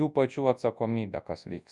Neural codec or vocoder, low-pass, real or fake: codec, 24 kHz, 0.9 kbps, WavTokenizer, large speech release; 10.8 kHz; fake